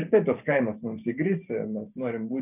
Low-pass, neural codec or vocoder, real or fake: 3.6 kHz; vocoder, 44.1 kHz, 128 mel bands every 512 samples, BigVGAN v2; fake